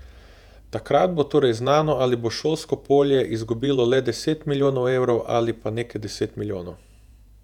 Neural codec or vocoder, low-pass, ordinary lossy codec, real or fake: vocoder, 48 kHz, 128 mel bands, Vocos; 19.8 kHz; none; fake